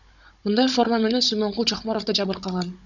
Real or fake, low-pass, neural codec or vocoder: fake; 7.2 kHz; codec, 16 kHz, 16 kbps, FunCodec, trained on Chinese and English, 50 frames a second